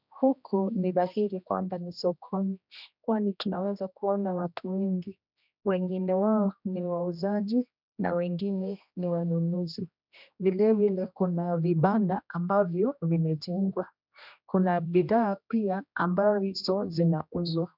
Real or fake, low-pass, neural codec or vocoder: fake; 5.4 kHz; codec, 16 kHz, 1 kbps, X-Codec, HuBERT features, trained on general audio